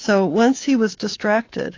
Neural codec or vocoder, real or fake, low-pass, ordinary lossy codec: codec, 24 kHz, 3.1 kbps, DualCodec; fake; 7.2 kHz; AAC, 32 kbps